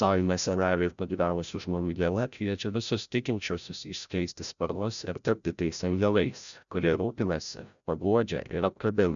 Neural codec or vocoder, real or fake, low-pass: codec, 16 kHz, 0.5 kbps, FreqCodec, larger model; fake; 7.2 kHz